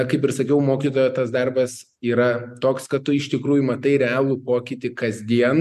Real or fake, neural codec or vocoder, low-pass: real; none; 14.4 kHz